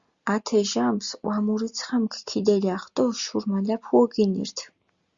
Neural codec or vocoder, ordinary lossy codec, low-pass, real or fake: none; Opus, 64 kbps; 7.2 kHz; real